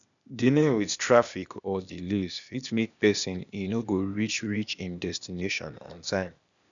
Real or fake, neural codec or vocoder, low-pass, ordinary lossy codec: fake; codec, 16 kHz, 0.8 kbps, ZipCodec; 7.2 kHz; none